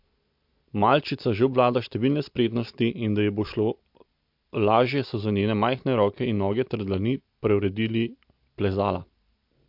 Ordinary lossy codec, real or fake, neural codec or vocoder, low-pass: MP3, 48 kbps; real; none; 5.4 kHz